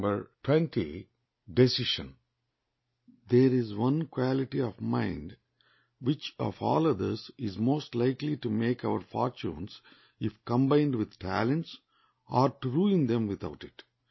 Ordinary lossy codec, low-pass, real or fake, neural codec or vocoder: MP3, 24 kbps; 7.2 kHz; real; none